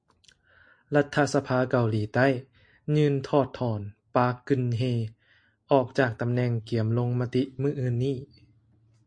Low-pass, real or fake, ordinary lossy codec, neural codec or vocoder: 9.9 kHz; real; MP3, 48 kbps; none